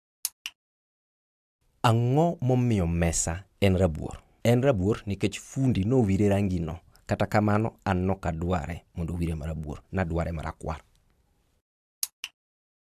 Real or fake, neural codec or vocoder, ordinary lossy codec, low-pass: real; none; AAC, 96 kbps; 14.4 kHz